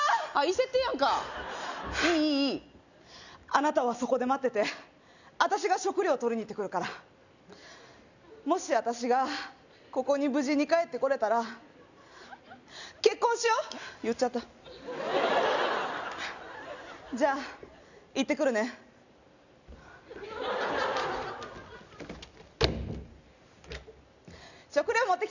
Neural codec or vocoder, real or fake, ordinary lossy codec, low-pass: none; real; none; 7.2 kHz